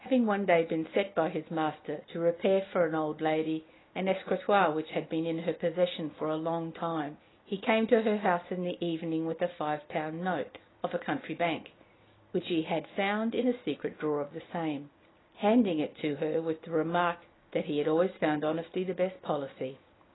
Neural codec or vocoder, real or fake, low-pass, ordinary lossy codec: none; real; 7.2 kHz; AAC, 16 kbps